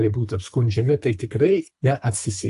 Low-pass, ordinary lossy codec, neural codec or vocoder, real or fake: 10.8 kHz; AAC, 64 kbps; codec, 24 kHz, 3 kbps, HILCodec; fake